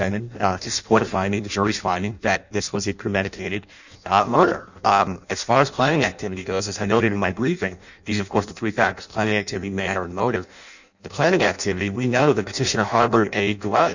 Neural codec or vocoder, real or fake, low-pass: codec, 16 kHz in and 24 kHz out, 0.6 kbps, FireRedTTS-2 codec; fake; 7.2 kHz